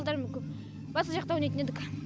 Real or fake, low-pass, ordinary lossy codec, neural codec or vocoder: real; none; none; none